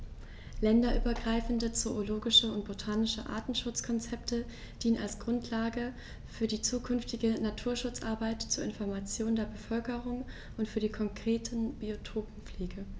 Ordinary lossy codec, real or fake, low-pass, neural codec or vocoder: none; real; none; none